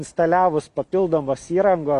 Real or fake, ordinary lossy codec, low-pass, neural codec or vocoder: real; MP3, 64 kbps; 10.8 kHz; none